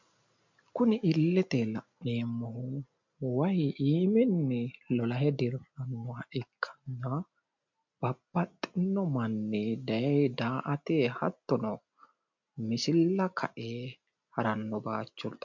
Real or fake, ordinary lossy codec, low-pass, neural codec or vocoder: real; MP3, 64 kbps; 7.2 kHz; none